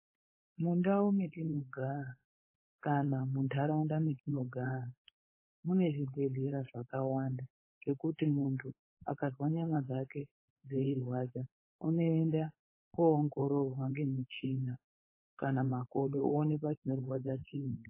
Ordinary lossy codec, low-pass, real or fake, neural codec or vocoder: MP3, 16 kbps; 3.6 kHz; fake; codec, 16 kHz, 4.8 kbps, FACodec